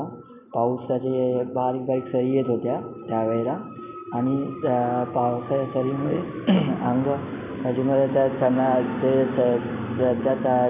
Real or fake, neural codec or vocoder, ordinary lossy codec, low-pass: real; none; none; 3.6 kHz